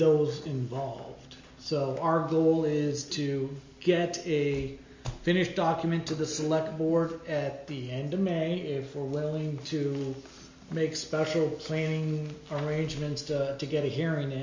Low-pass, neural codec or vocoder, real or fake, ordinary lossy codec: 7.2 kHz; none; real; MP3, 64 kbps